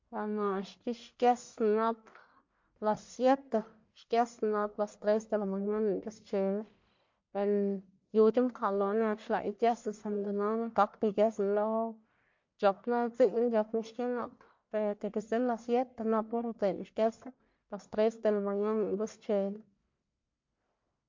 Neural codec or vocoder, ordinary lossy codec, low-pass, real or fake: codec, 44.1 kHz, 1.7 kbps, Pupu-Codec; MP3, 48 kbps; 7.2 kHz; fake